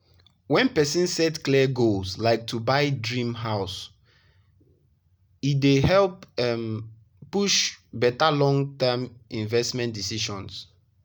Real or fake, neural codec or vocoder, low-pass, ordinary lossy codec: real; none; none; none